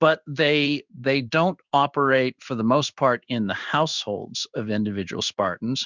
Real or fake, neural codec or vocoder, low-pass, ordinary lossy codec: fake; codec, 16 kHz in and 24 kHz out, 1 kbps, XY-Tokenizer; 7.2 kHz; Opus, 64 kbps